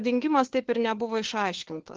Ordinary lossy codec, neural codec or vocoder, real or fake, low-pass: Opus, 16 kbps; codec, 16 kHz, 4 kbps, FunCodec, trained on LibriTTS, 50 frames a second; fake; 7.2 kHz